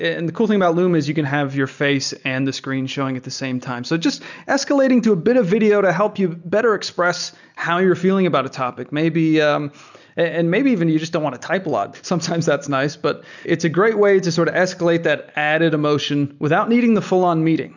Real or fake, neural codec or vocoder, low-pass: real; none; 7.2 kHz